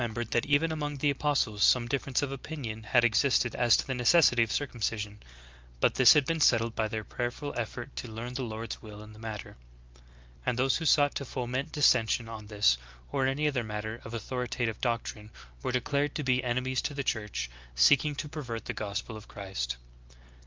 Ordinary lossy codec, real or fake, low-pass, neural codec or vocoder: Opus, 24 kbps; real; 7.2 kHz; none